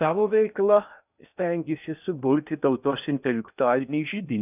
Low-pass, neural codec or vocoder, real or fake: 3.6 kHz; codec, 16 kHz in and 24 kHz out, 0.6 kbps, FocalCodec, streaming, 2048 codes; fake